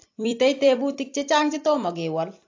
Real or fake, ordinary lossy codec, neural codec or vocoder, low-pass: fake; AAC, 48 kbps; vocoder, 44.1 kHz, 128 mel bands every 256 samples, BigVGAN v2; 7.2 kHz